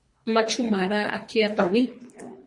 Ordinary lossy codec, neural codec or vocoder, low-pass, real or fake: MP3, 48 kbps; codec, 24 kHz, 3 kbps, HILCodec; 10.8 kHz; fake